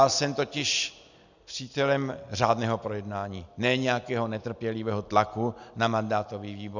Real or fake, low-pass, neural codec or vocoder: real; 7.2 kHz; none